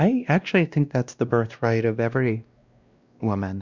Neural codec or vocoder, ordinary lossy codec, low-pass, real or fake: codec, 16 kHz, 1 kbps, X-Codec, WavLM features, trained on Multilingual LibriSpeech; Opus, 64 kbps; 7.2 kHz; fake